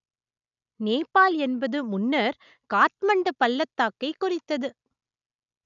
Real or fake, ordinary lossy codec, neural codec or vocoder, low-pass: real; none; none; 7.2 kHz